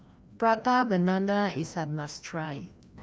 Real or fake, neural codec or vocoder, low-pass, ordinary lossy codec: fake; codec, 16 kHz, 1 kbps, FreqCodec, larger model; none; none